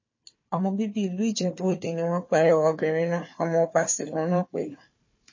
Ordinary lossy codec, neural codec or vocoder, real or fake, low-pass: MP3, 32 kbps; codec, 16 kHz, 4 kbps, FunCodec, trained on Chinese and English, 50 frames a second; fake; 7.2 kHz